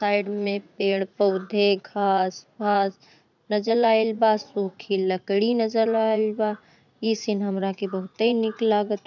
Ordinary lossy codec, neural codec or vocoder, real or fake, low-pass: none; vocoder, 44.1 kHz, 80 mel bands, Vocos; fake; 7.2 kHz